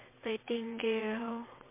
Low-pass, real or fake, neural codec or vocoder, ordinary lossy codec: 3.6 kHz; fake; vocoder, 22.05 kHz, 80 mel bands, WaveNeXt; MP3, 24 kbps